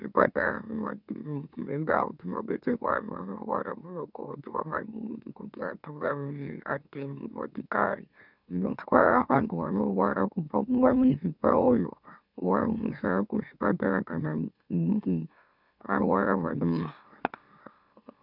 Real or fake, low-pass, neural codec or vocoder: fake; 5.4 kHz; autoencoder, 44.1 kHz, a latent of 192 numbers a frame, MeloTTS